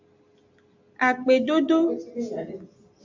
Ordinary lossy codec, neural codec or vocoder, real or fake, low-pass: AAC, 48 kbps; none; real; 7.2 kHz